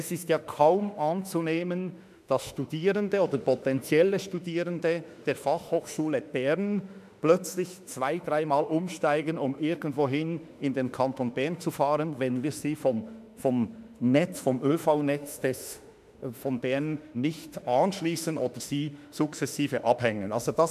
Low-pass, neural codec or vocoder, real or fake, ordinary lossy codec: 14.4 kHz; autoencoder, 48 kHz, 32 numbers a frame, DAC-VAE, trained on Japanese speech; fake; none